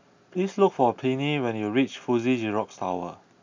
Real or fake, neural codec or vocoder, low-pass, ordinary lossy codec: real; none; 7.2 kHz; MP3, 64 kbps